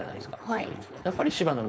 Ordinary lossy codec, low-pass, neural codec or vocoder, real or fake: none; none; codec, 16 kHz, 4.8 kbps, FACodec; fake